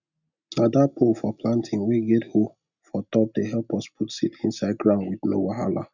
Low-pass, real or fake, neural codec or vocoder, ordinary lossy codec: 7.2 kHz; real; none; none